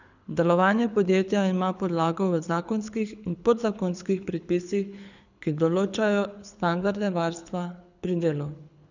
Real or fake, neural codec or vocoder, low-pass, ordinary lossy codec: fake; codec, 24 kHz, 6 kbps, HILCodec; 7.2 kHz; none